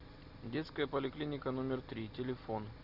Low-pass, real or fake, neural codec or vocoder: 5.4 kHz; real; none